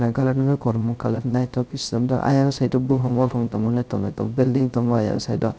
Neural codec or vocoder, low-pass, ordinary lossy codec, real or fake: codec, 16 kHz, 0.3 kbps, FocalCodec; none; none; fake